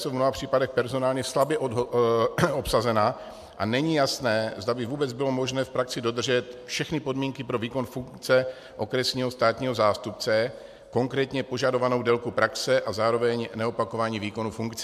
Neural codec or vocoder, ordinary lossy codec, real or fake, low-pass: none; MP3, 96 kbps; real; 14.4 kHz